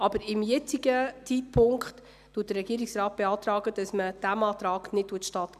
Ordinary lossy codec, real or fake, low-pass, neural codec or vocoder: none; real; 14.4 kHz; none